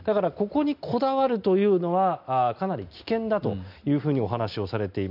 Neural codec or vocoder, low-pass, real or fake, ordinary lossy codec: none; 5.4 kHz; real; none